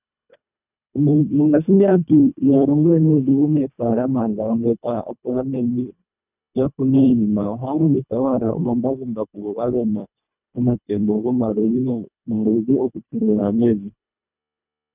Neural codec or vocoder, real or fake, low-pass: codec, 24 kHz, 1.5 kbps, HILCodec; fake; 3.6 kHz